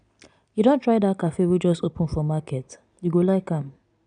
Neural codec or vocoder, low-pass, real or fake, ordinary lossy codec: none; 9.9 kHz; real; none